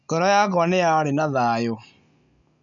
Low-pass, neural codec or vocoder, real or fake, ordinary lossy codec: 7.2 kHz; none; real; none